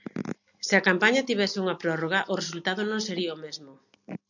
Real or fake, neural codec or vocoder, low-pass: real; none; 7.2 kHz